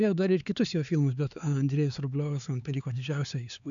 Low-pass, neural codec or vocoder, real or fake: 7.2 kHz; codec, 16 kHz, 4 kbps, X-Codec, HuBERT features, trained on LibriSpeech; fake